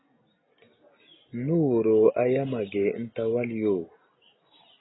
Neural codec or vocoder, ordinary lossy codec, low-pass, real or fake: none; AAC, 16 kbps; 7.2 kHz; real